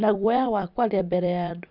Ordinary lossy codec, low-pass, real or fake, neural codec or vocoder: none; 5.4 kHz; fake; vocoder, 44.1 kHz, 128 mel bands every 256 samples, BigVGAN v2